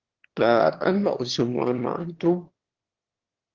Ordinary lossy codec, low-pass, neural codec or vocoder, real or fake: Opus, 16 kbps; 7.2 kHz; autoencoder, 22.05 kHz, a latent of 192 numbers a frame, VITS, trained on one speaker; fake